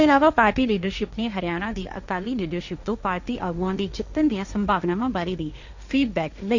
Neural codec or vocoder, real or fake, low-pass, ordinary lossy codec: codec, 16 kHz, 1.1 kbps, Voila-Tokenizer; fake; 7.2 kHz; none